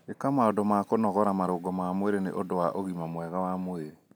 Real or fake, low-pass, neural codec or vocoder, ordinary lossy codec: real; none; none; none